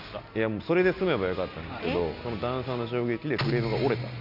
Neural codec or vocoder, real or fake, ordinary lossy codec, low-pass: none; real; none; 5.4 kHz